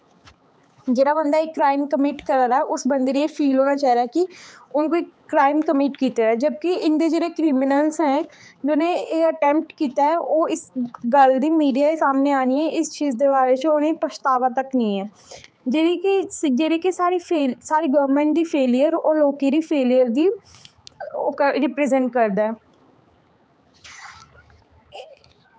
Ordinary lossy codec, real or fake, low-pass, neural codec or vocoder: none; fake; none; codec, 16 kHz, 4 kbps, X-Codec, HuBERT features, trained on balanced general audio